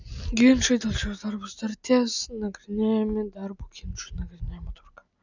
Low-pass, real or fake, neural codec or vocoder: 7.2 kHz; real; none